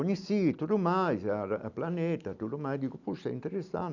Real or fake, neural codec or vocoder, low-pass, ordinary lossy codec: real; none; 7.2 kHz; none